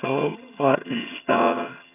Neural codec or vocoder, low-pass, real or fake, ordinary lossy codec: vocoder, 22.05 kHz, 80 mel bands, HiFi-GAN; 3.6 kHz; fake; none